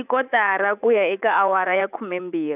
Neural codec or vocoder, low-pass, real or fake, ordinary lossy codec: vocoder, 44.1 kHz, 80 mel bands, Vocos; 3.6 kHz; fake; none